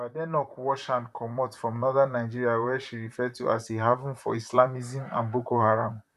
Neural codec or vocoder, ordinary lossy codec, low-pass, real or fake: vocoder, 44.1 kHz, 128 mel bands, Pupu-Vocoder; none; 14.4 kHz; fake